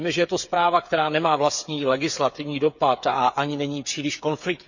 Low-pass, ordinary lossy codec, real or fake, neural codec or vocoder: 7.2 kHz; none; fake; codec, 16 kHz, 8 kbps, FreqCodec, smaller model